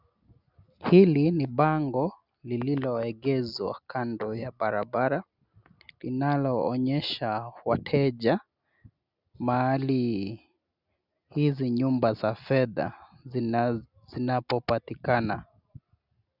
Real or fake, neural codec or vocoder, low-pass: real; none; 5.4 kHz